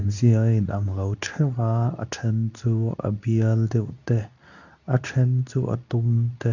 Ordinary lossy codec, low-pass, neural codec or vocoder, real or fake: none; 7.2 kHz; codec, 24 kHz, 0.9 kbps, WavTokenizer, medium speech release version 2; fake